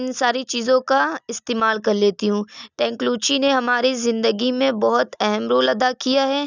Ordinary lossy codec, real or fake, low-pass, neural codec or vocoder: none; real; 7.2 kHz; none